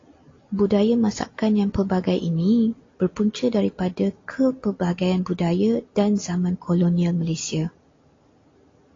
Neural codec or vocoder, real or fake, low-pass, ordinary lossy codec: none; real; 7.2 kHz; AAC, 32 kbps